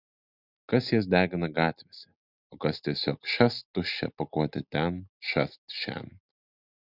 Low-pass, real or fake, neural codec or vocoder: 5.4 kHz; real; none